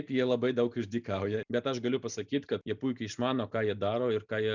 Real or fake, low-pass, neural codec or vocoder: real; 7.2 kHz; none